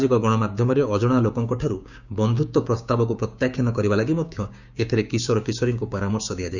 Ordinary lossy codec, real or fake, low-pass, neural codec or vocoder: none; fake; 7.2 kHz; codec, 16 kHz, 6 kbps, DAC